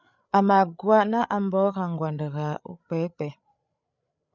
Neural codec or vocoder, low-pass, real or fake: codec, 16 kHz, 8 kbps, FreqCodec, larger model; 7.2 kHz; fake